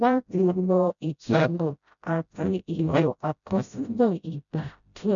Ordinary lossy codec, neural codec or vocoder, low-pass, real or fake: none; codec, 16 kHz, 0.5 kbps, FreqCodec, smaller model; 7.2 kHz; fake